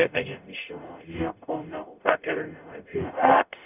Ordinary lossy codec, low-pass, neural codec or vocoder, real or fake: none; 3.6 kHz; codec, 44.1 kHz, 0.9 kbps, DAC; fake